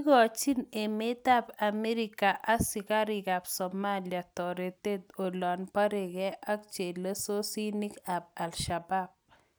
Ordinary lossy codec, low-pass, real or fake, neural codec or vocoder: none; none; real; none